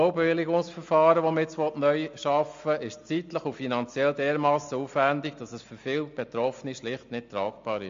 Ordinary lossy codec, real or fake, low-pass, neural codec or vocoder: MP3, 48 kbps; real; 7.2 kHz; none